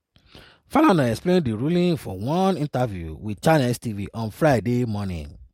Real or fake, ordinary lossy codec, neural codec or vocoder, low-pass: real; MP3, 64 kbps; none; 19.8 kHz